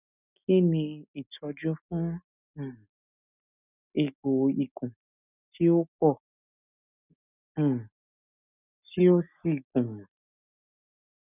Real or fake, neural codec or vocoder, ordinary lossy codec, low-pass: real; none; none; 3.6 kHz